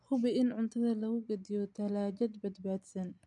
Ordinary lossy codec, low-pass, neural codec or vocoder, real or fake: MP3, 96 kbps; 10.8 kHz; none; real